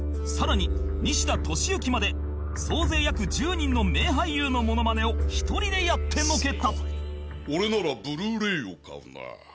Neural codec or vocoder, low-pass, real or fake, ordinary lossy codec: none; none; real; none